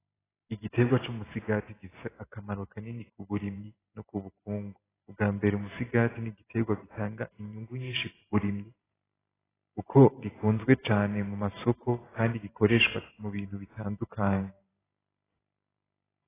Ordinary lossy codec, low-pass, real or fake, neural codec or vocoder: AAC, 16 kbps; 3.6 kHz; fake; vocoder, 44.1 kHz, 128 mel bands every 512 samples, BigVGAN v2